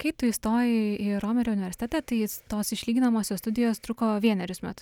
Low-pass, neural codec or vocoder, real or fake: 19.8 kHz; none; real